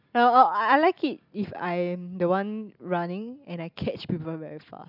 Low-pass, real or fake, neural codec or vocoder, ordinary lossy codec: 5.4 kHz; real; none; none